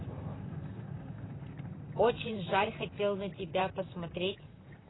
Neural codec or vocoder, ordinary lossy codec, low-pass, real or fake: vocoder, 44.1 kHz, 128 mel bands, Pupu-Vocoder; AAC, 16 kbps; 7.2 kHz; fake